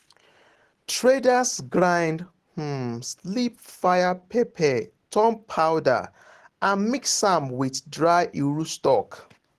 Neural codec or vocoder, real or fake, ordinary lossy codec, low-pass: none; real; Opus, 16 kbps; 14.4 kHz